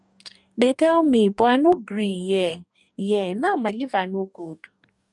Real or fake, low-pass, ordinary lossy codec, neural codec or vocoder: fake; 10.8 kHz; none; codec, 44.1 kHz, 2.6 kbps, DAC